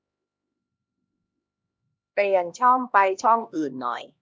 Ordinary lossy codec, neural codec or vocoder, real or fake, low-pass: none; codec, 16 kHz, 1 kbps, X-Codec, HuBERT features, trained on LibriSpeech; fake; none